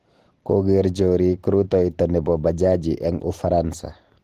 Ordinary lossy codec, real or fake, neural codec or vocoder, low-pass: Opus, 16 kbps; real; none; 14.4 kHz